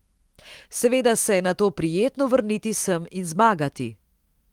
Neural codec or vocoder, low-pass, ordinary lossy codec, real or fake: autoencoder, 48 kHz, 128 numbers a frame, DAC-VAE, trained on Japanese speech; 19.8 kHz; Opus, 24 kbps; fake